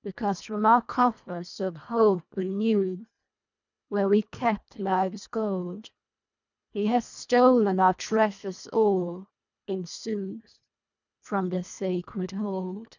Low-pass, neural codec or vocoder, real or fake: 7.2 kHz; codec, 24 kHz, 1.5 kbps, HILCodec; fake